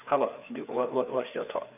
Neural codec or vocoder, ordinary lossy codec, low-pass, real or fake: codec, 16 kHz, 4 kbps, FreqCodec, larger model; none; 3.6 kHz; fake